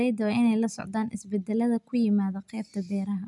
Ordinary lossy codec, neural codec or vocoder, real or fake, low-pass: none; none; real; 10.8 kHz